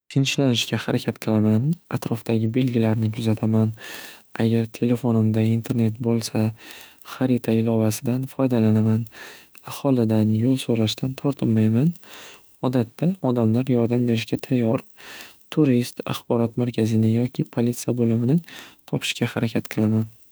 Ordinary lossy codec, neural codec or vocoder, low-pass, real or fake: none; autoencoder, 48 kHz, 32 numbers a frame, DAC-VAE, trained on Japanese speech; none; fake